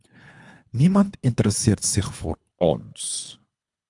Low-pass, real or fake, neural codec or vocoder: 10.8 kHz; fake; codec, 24 kHz, 3 kbps, HILCodec